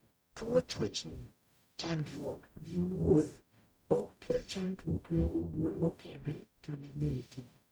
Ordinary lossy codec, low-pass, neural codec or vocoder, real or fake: none; none; codec, 44.1 kHz, 0.9 kbps, DAC; fake